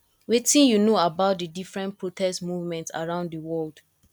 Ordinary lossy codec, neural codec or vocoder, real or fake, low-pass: none; none; real; 19.8 kHz